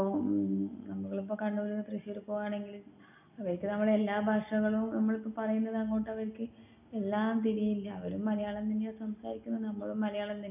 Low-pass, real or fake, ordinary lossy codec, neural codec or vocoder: 3.6 kHz; real; none; none